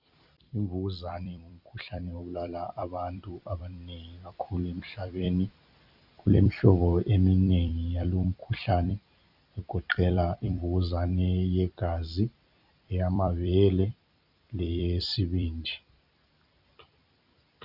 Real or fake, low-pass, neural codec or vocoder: fake; 5.4 kHz; vocoder, 44.1 kHz, 128 mel bands every 256 samples, BigVGAN v2